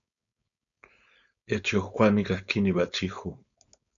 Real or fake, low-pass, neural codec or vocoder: fake; 7.2 kHz; codec, 16 kHz, 4.8 kbps, FACodec